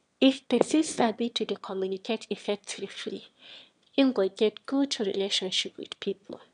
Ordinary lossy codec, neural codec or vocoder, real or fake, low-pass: none; autoencoder, 22.05 kHz, a latent of 192 numbers a frame, VITS, trained on one speaker; fake; 9.9 kHz